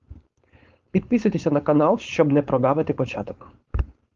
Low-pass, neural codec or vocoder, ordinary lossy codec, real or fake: 7.2 kHz; codec, 16 kHz, 4.8 kbps, FACodec; Opus, 32 kbps; fake